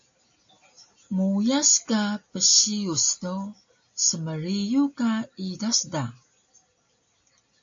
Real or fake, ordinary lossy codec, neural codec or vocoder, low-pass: real; AAC, 64 kbps; none; 7.2 kHz